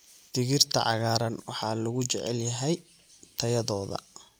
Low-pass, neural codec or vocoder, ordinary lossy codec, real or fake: none; none; none; real